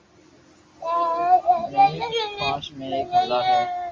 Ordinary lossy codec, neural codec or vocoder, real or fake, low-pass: Opus, 32 kbps; none; real; 7.2 kHz